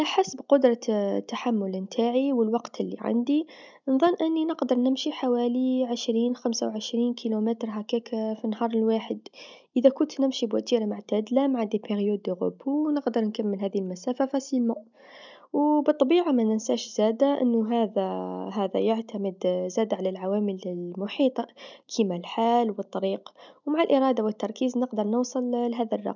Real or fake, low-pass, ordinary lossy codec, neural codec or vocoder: real; 7.2 kHz; none; none